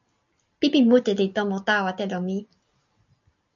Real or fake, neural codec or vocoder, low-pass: real; none; 7.2 kHz